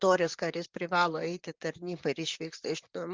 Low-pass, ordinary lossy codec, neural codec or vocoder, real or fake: 7.2 kHz; Opus, 32 kbps; vocoder, 44.1 kHz, 128 mel bands, Pupu-Vocoder; fake